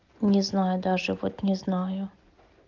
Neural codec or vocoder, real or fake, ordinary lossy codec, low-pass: none; real; Opus, 32 kbps; 7.2 kHz